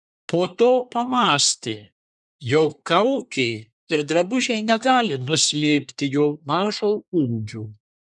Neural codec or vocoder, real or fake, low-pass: codec, 24 kHz, 1 kbps, SNAC; fake; 10.8 kHz